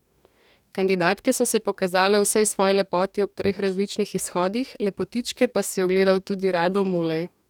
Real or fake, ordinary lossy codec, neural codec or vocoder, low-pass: fake; none; codec, 44.1 kHz, 2.6 kbps, DAC; 19.8 kHz